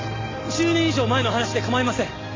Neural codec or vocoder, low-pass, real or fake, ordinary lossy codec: none; 7.2 kHz; real; AAC, 32 kbps